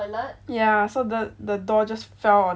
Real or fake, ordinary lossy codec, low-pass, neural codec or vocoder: real; none; none; none